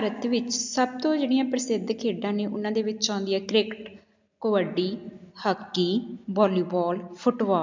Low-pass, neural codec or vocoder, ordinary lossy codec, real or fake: 7.2 kHz; none; MP3, 64 kbps; real